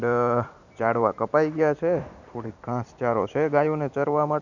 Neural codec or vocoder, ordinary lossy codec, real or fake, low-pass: vocoder, 44.1 kHz, 128 mel bands every 512 samples, BigVGAN v2; none; fake; 7.2 kHz